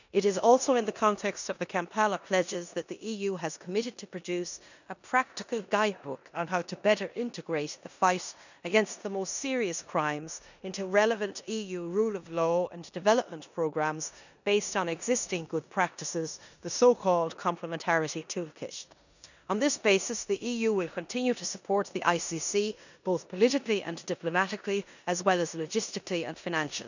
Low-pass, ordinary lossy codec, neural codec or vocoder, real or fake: 7.2 kHz; none; codec, 16 kHz in and 24 kHz out, 0.9 kbps, LongCat-Audio-Codec, four codebook decoder; fake